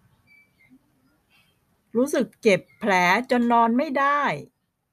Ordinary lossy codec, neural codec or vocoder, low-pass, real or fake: none; none; 14.4 kHz; real